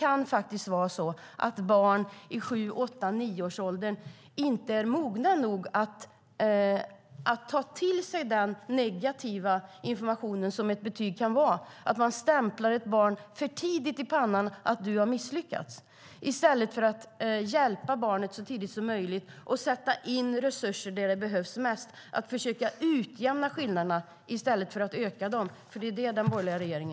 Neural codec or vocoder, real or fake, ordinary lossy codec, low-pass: none; real; none; none